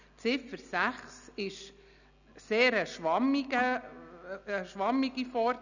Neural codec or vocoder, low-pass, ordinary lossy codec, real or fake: none; 7.2 kHz; none; real